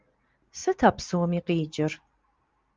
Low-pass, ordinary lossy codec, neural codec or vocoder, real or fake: 7.2 kHz; Opus, 32 kbps; none; real